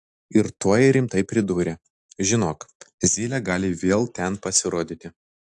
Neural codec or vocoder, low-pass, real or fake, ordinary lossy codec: none; 10.8 kHz; real; AAC, 64 kbps